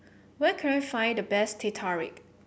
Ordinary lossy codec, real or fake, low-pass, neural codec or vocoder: none; real; none; none